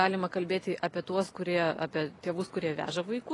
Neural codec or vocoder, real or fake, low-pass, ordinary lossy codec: none; real; 10.8 kHz; AAC, 32 kbps